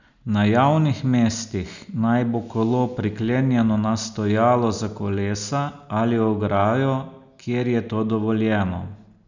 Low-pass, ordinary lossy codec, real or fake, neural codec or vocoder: 7.2 kHz; none; real; none